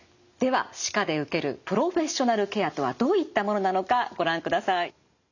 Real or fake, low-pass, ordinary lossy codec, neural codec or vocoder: real; 7.2 kHz; none; none